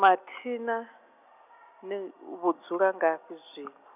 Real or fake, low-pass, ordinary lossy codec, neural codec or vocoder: real; 3.6 kHz; none; none